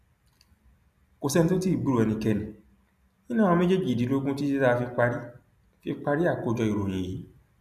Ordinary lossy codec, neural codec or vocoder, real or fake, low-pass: none; none; real; 14.4 kHz